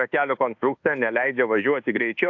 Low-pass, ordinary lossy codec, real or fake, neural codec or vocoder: 7.2 kHz; AAC, 48 kbps; fake; codec, 24 kHz, 1.2 kbps, DualCodec